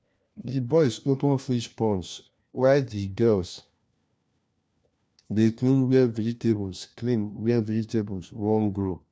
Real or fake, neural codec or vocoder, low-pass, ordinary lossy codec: fake; codec, 16 kHz, 1 kbps, FunCodec, trained on LibriTTS, 50 frames a second; none; none